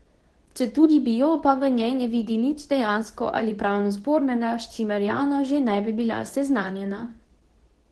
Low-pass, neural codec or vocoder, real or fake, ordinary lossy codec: 10.8 kHz; codec, 24 kHz, 0.9 kbps, WavTokenizer, medium speech release version 2; fake; Opus, 16 kbps